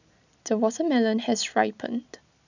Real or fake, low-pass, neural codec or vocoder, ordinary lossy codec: real; 7.2 kHz; none; none